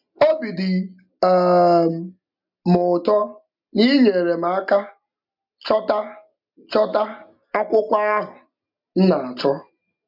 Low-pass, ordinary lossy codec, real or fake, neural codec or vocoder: 5.4 kHz; MP3, 48 kbps; real; none